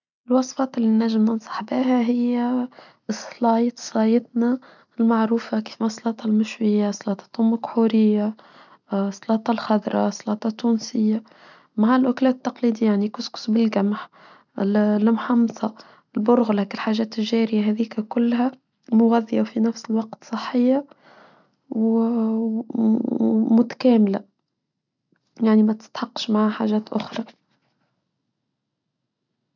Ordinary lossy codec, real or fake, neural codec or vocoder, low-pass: none; real; none; 7.2 kHz